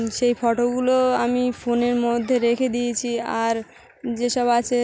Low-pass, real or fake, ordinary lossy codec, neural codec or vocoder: none; real; none; none